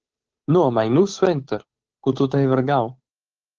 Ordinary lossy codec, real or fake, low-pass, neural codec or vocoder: Opus, 16 kbps; fake; 7.2 kHz; codec, 16 kHz, 8 kbps, FunCodec, trained on Chinese and English, 25 frames a second